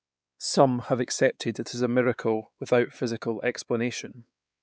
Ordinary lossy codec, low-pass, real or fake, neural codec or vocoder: none; none; fake; codec, 16 kHz, 4 kbps, X-Codec, WavLM features, trained on Multilingual LibriSpeech